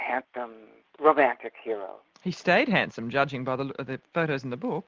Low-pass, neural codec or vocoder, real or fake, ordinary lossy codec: 7.2 kHz; none; real; Opus, 32 kbps